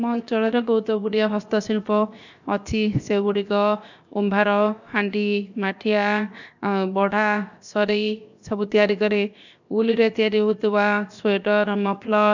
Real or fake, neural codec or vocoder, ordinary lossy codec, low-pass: fake; codec, 16 kHz, 0.7 kbps, FocalCodec; none; 7.2 kHz